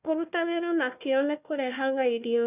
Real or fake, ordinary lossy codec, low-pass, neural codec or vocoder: fake; none; 3.6 kHz; codec, 16 kHz, 1 kbps, FunCodec, trained on Chinese and English, 50 frames a second